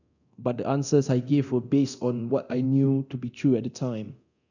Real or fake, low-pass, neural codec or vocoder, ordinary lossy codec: fake; 7.2 kHz; codec, 24 kHz, 0.9 kbps, DualCodec; none